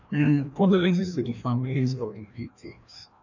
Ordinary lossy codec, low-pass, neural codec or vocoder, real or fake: none; 7.2 kHz; codec, 16 kHz, 1 kbps, FreqCodec, larger model; fake